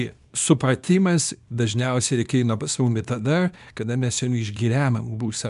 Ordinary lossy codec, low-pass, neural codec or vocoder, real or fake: MP3, 96 kbps; 10.8 kHz; codec, 24 kHz, 0.9 kbps, WavTokenizer, small release; fake